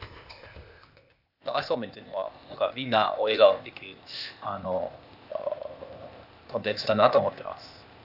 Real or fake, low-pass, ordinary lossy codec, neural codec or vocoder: fake; 5.4 kHz; none; codec, 16 kHz, 0.8 kbps, ZipCodec